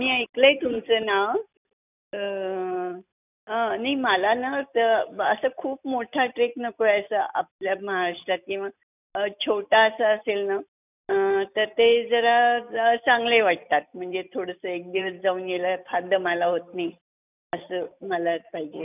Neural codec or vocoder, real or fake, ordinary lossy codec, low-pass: none; real; none; 3.6 kHz